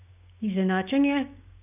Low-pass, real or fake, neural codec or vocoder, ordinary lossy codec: 3.6 kHz; fake; codec, 16 kHz, 6 kbps, DAC; none